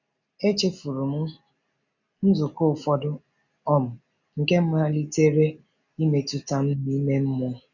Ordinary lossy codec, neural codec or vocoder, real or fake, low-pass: none; none; real; 7.2 kHz